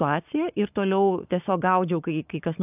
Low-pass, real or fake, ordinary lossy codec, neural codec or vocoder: 3.6 kHz; fake; AAC, 32 kbps; codec, 44.1 kHz, 7.8 kbps, Pupu-Codec